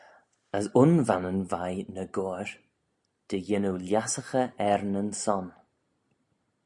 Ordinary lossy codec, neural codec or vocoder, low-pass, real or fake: MP3, 96 kbps; none; 10.8 kHz; real